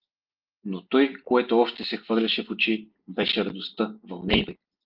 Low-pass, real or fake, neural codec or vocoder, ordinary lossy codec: 5.4 kHz; real; none; Opus, 24 kbps